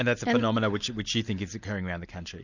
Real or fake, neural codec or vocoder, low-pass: real; none; 7.2 kHz